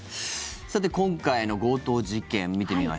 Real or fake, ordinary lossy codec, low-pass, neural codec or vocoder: real; none; none; none